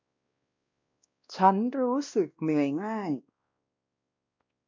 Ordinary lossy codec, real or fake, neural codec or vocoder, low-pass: MP3, 64 kbps; fake; codec, 16 kHz, 1 kbps, X-Codec, WavLM features, trained on Multilingual LibriSpeech; 7.2 kHz